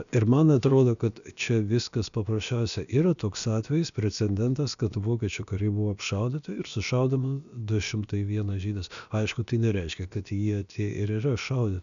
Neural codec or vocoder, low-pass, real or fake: codec, 16 kHz, about 1 kbps, DyCAST, with the encoder's durations; 7.2 kHz; fake